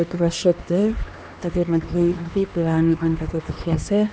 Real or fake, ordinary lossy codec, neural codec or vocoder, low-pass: fake; none; codec, 16 kHz, 2 kbps, X-Codec, HuBERT features, trained on LibriSpeech; none